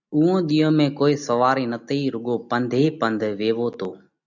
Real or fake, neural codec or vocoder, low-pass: real; none; 7.2 kHz